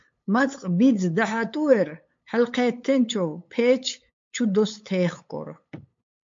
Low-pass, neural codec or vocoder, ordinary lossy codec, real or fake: 7.2 kHz; codec, 16 kHz, 8 kbps, FunCodec, trained on LibriTTS, 25 frames a second; MP3, 48 kbps; fake